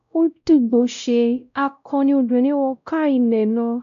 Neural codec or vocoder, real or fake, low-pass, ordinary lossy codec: codec, 16 kHz, 0.5 kbps, X-Codec, WavLM features, trained on Multilingual LibriSpeech; fake; 7.2 kHz; none